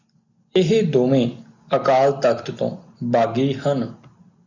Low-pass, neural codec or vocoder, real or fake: 7.2 kHz; none; real